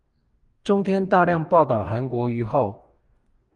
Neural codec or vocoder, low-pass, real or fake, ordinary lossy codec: codec, 44.1 kHz, 2.6 kbps, DAC; 10.8 kHz; fake; Opus, 32 kbps